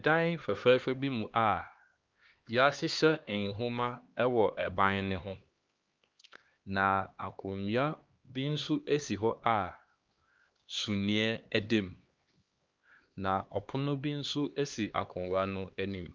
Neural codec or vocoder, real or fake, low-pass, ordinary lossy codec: codec, 16 kHz, 2 kbps, X-Codec, HuBERT features, trained on LibriSpeech; fake; 7.2 kHz; Opus, 24 kbps